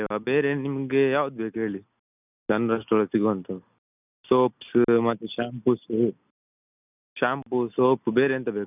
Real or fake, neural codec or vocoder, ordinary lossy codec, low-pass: real; none; none; 3.6 kHz